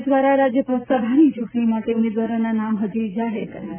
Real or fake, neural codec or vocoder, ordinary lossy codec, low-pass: real; none; MP3, 32 kbps; 3.6 kHz